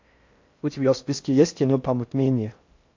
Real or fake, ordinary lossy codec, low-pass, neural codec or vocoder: fake; AAC, 48 kbps; 7.2 kHz; codec, 16 kHz in and 24 kHz out, 0.6 kbps, FocalCodec, streaming, 4096 codes